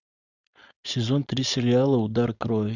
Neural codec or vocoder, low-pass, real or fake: codec, 16 kHz, 4.8 kbps, FACodec; 7.2 kHz; fake